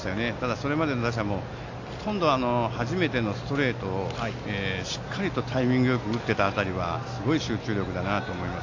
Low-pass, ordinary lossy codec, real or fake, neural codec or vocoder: 7.2 kHz; AAC, 48 kbps; real; none